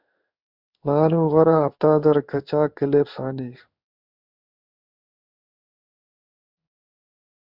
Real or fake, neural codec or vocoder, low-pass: fake; codec, 16 kHz in and 24 kHz out, 1 kbps, XY-Tokenizer; 5.4 kHz